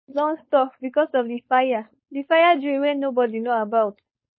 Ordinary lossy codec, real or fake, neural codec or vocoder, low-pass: MP3, 24 kbps; fake; codec, 16 kHz, 4.8 kbps, FACodec; 7.2 kHz